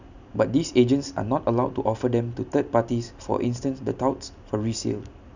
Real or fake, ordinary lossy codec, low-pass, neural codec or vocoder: real; none; 7.2 kHz; none